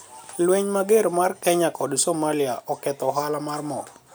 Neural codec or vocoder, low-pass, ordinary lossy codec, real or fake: none; none; none; real